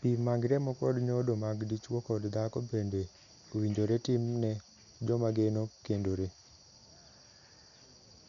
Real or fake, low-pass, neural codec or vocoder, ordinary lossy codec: real; 7.2 kHz; none; none